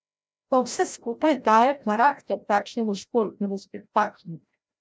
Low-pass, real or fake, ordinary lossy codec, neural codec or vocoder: none; fake; none; codec, 16 kHz, 0.5 kbps, FreqCodec, larger model